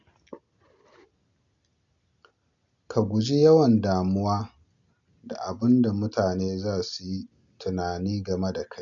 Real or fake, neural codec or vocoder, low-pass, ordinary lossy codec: real; none; 7.2 kHz; none